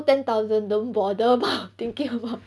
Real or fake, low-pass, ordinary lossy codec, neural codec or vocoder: real; none; none; none